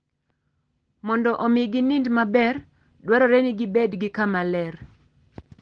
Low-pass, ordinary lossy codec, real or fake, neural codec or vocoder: 9.9 kHz; Opus, 16 kbps; real; none